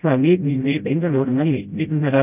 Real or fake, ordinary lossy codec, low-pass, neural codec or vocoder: fake; none; 3.6 kHz; codec, 16 kHz, 0.5 kbps, FreqCodec, smaller model